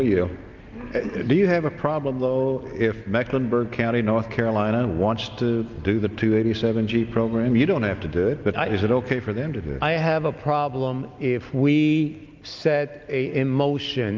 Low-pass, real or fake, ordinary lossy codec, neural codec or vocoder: 7.2 kHz; real; Opus, 24 kbps; none